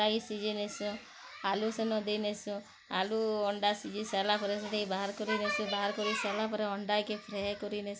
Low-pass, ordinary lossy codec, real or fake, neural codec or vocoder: none; none; real; none